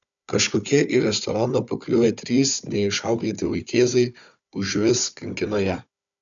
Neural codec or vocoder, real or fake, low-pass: codec, 16 kHz, 4 kbps, FunCodec, trained on Chinese and English, 50 frames a second; fake; 7.2 kHz